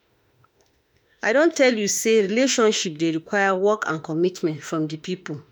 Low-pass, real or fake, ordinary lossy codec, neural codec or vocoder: none; fake; none; autoencoder, 48 kHz, 32 numbers a frame, DAC-VAE, trained on Japanese speech